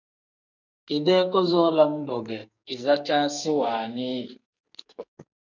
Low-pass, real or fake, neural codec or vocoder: 7.2 kHz; fake; codec, 44.1 kHz, 2.6 kbps, SNAC